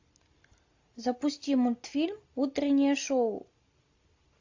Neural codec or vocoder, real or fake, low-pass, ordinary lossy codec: none; real; 7.2 kHz; AAC, 48 kbps